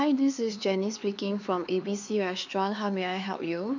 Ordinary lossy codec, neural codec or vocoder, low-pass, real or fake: none; codec, 16 kHz, 4 kbps, X-Codec, HuBERT features, trained on LibriSpeech; 7.2 kHz; fake